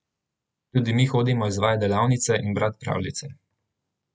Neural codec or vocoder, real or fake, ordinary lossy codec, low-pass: none; real; none; none